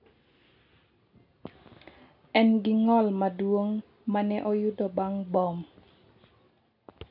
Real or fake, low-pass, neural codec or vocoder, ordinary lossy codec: real; 5.4 kHz; none; none